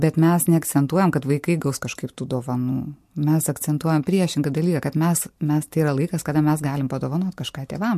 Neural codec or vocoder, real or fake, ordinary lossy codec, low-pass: none; real; MP3, 64 kbps; 14.4 kHz